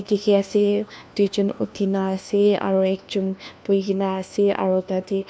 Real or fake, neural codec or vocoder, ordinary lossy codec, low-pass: fake; codec, 16 kHz, 1 kbps, FunCodec, trained on LibriTTS, 50 frames a second; none; none